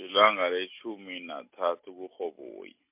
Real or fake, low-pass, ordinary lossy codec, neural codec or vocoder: real; 3.6 kHz; MP3, 32 kbps; none